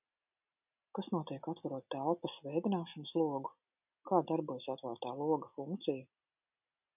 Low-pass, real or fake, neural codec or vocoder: 3.6 kHz; real; none